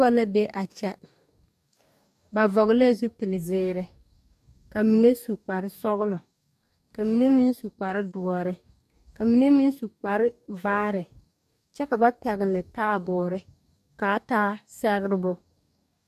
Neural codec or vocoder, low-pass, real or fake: codec, 44.1 kHz, 2.6 kbps, DAC; 14.4 kHz; fake